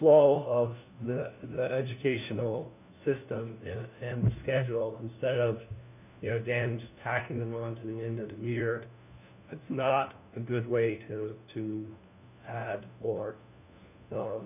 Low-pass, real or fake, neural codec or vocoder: 3.6 kHz; fake; codec, 16 kHz, 1 kbps, FunCodec, trained on LibriTTS, 50 frames a second